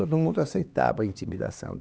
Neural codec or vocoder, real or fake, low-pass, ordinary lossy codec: codec, 16 kHz, 2 kbps, X-Codec, HuBERT features, trained on LibriSpeech; fake; none; none